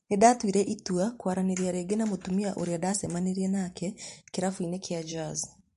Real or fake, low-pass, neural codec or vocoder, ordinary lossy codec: fake; 14.4 kHz; vocoder, 44.1 kHz, 128 mel bands every 512 samples, BigVGAN v2; MP3, 48 kbps